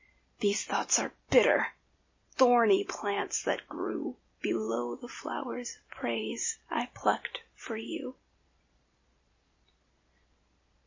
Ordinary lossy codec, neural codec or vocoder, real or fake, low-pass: MP3, 32 kbps; none; real; 7.2 kHz